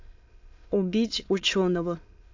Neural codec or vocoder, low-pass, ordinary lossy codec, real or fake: autoencoder, 22.05 kHz, a latent of 192 numbers a frame, VITS, trained on many speakers; 7.2 kHz; AAC, 48 kbps; fake